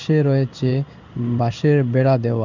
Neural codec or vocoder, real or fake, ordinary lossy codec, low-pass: codec, 16 kHz in and 24 kHz out, 1 kbps, XY-Tokenizer; fake; none; 7.2 kHz